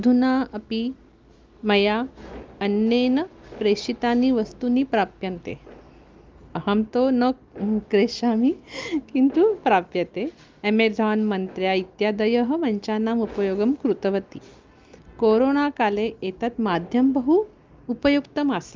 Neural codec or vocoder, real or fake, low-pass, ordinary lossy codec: none; real; 7.2 kHz; Opus, 32 kbps